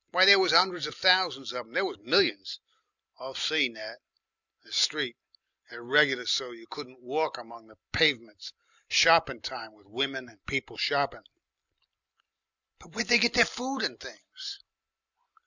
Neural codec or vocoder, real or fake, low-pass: none; real; 7.2 kHz